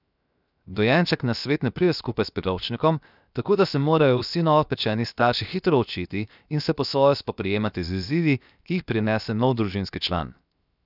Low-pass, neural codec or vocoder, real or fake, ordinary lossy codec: 5.4 kHz; codec, 16 kHz, 0.7 kbps, FocalCodec; fake; none